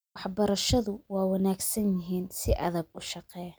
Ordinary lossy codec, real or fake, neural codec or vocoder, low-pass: none; real; none; none